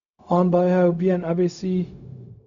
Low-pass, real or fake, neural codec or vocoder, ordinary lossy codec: 7.2 kHz; fake; codec, 16 kHz, 0.4 kbps, LongCat-Audio-Codec; none